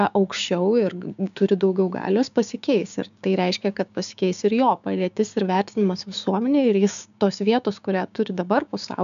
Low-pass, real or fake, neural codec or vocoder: 7.2 kHz; fake; codec, 16 kHz, 6 kbps, DAC